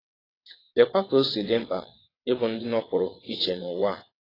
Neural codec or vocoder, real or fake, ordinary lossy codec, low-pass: vocoder, 22.05 kHz, 80 mel bands, WaveNeXt; fake; AAC, 24 kbps; 5.4 kHz